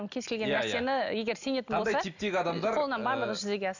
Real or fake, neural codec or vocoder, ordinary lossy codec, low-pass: real; none; none; 7.2 kHz